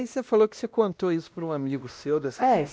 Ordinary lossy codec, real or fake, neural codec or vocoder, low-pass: none; fake; codec, 16 kHz, 1 kbps, X-Codec, WavLM features, trained on Multilingual LibriSpeech; none